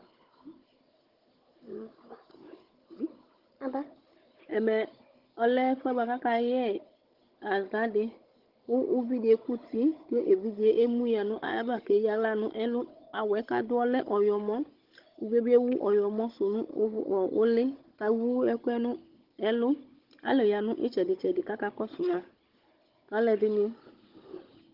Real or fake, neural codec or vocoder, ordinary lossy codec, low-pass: fake; codec, 16 kHz, 16 kbps, FunCodec, trained on Chinese and English, 50 frames a second; Opus, 16 kbps; 5.4 kHz